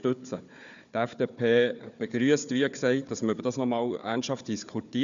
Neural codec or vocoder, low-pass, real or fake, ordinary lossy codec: codec, 16 kHz, 4 kbps, FunCodec, trained on Chinese and English, 50 frames a second; 7.2 kHz; fake; none